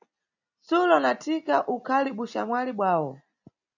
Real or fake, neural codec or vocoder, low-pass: real; none; 7.2 kHz